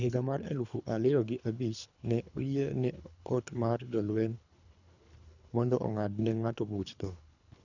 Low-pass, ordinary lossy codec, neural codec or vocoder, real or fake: 7.2 kHz; none; codec, 24 kHz, 3 kbps, HILCodec; fake